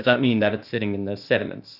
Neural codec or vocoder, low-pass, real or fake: codec, 16 kHz, 0.8 kbps, ZipCodec; 5.4 kHz; fake